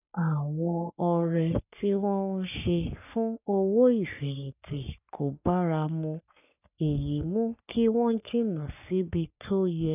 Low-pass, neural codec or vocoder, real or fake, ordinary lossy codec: 3.6 kHz; codec, 44.1 kHz, 3.4 kbps, Pupu-Codec; fake; none